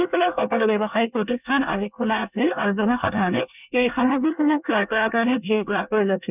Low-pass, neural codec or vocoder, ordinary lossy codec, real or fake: 3.6 kHz; codec, 24 kHz, 1 kbps, SNAC; none; fake